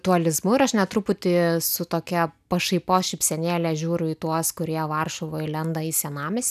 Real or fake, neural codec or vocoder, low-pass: real; none; 14.4 kHz